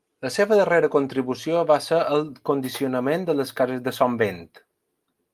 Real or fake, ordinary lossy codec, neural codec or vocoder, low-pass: real; Opus, 32 kbps; none; 14.4 kHz